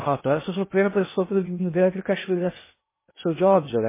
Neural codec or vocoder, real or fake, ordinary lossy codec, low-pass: codec, 16 kHz in and 24 kHz out, 0.6 kbps, FocalCodec, streaming, 2048 codes; fake; MP3, 16 kbps; 3.6 kHz